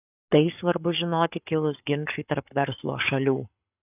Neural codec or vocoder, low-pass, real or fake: codec, 44.1 kHz, 7.8 kbps, Pupu-Codec; 3.6 kHz; fake